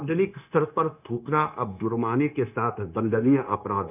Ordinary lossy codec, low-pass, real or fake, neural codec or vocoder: none; 3.6 kHz; fake; codec, 16 kHz, 0.9 kbps, LongCat-Audio-Codec